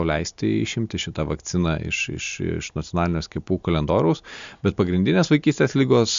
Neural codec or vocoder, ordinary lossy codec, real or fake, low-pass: none; MP3, 64 kbps; real; 7.2 kHz